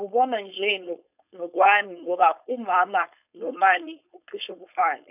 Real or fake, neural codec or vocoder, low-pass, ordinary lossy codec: fake; codec, 16 kHz, 4.8 kbps, FACodec; 3.6 kHz; none